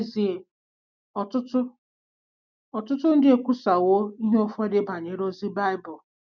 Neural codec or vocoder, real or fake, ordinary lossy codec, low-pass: none; real; none; 7.2 kHz